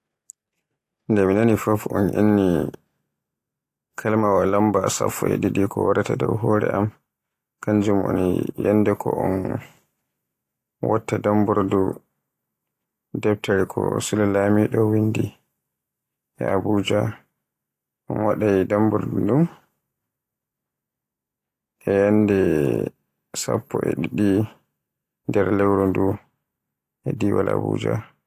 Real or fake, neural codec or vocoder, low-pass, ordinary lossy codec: real; none; 14.4 kHz; AAC, 48 kbps